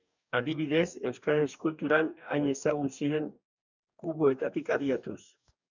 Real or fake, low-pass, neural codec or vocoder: fake; 7.2 kHz; codec, 44.1 kHz, 2.6 kbps, DAC